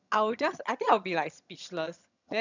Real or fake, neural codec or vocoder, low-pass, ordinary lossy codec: fake; vocoder, 22.05 kHz, 80 mel bands, HiFi-GAN; 7.2 kHz; none